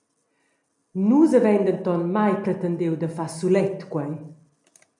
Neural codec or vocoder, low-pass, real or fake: none; 10.8 kHz; real